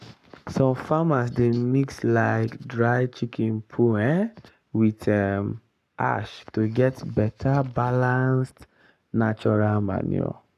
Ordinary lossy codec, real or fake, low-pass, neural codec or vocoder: none; fake; 14.4 kHz; codec, 44.1 kHz, 7.8 kbps, Pupu-Codec